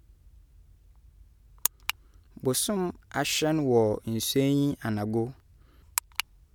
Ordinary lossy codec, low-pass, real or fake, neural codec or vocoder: none; 19.8 kHz; real; none